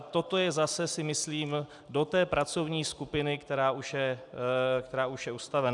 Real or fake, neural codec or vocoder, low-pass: real; none; 10.8 kHz